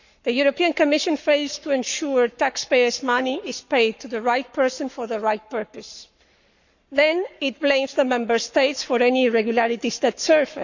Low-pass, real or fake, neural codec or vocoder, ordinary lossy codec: 7.2 kHz; fake; codec, 44.1 kHz, 7.8 kbps, Pupu-Codec; none